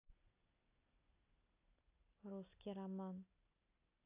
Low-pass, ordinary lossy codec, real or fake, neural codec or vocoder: 3.6 kHz; AAC, 24 kbps; real; none